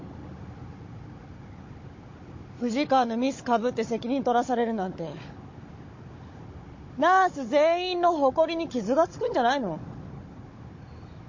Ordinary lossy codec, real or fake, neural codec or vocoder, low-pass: MP3, 32 kbps; fake; codec, 16 kHz, 16 kbps, FunCodec, trained on Chinese and English, 50 frames a second; 7.2 kHz